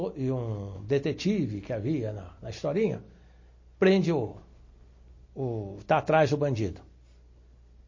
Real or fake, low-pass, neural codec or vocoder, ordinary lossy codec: real; 7.2 kHz; none; MP3, 32 kbps